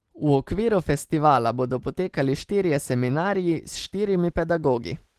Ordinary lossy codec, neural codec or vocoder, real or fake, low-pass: Opus, 16 kbps; none; real; 14.4 kHz